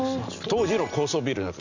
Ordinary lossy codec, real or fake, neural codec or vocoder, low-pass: none; real; none; 7.2 kHz